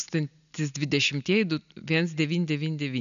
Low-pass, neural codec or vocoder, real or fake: 7.2 kHz; none; real